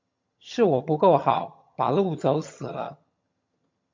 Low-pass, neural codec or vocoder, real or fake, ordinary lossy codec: 7.2 kHz; vocoder, 22.05 kHz, 80 mel bands, HiFi-GAN; fake; MP3, 48 kbps